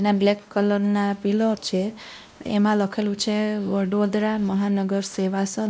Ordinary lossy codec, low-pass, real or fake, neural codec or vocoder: none; none; fake; codec, 16 kHz, 1 kbps, X-Codec, WavLM features, trained on Multilingual LibriSpeech